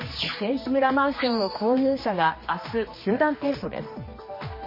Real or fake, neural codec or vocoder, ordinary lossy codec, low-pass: fake; codec, 16 kHz, 2 kbps, X-Codec, HuBERT features, trained on general audio; MP3, 24 kbps; 5.4 kHz